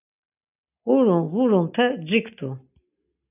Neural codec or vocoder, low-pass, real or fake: none; 3.6 kHz; real